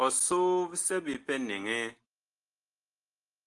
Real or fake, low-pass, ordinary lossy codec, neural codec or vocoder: real; 10.8 kHz; Opus, 32 kbps; none